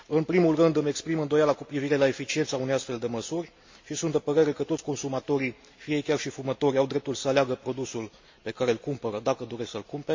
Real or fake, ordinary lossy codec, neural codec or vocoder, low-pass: real; MP3, 48 kbps; none; 7.2 kHz